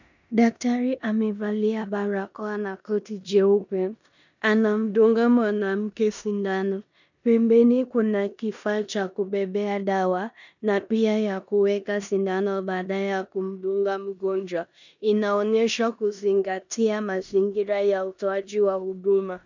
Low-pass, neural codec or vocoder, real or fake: 7.2 kHz; codec, 16 kHz in and 24 kHz out, 0.9 kbps, LongCat-Audio-Codec, four codebook decoder; fake